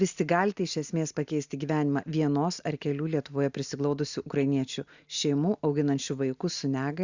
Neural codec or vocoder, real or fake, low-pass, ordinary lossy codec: none; real; 7.2 kHz; Opus, 64 kbps